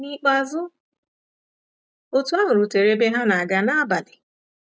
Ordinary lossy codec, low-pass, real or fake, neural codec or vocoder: none; none; real; none